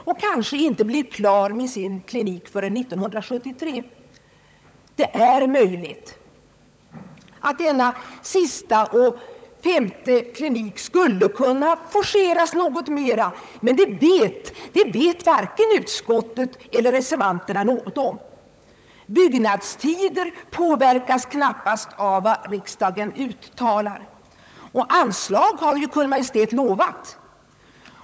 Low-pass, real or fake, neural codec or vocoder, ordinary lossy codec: none; fake; codec, 16 kHz, 16 kbps, FunCodec, trained on LibriTTS, 50 frames a second; none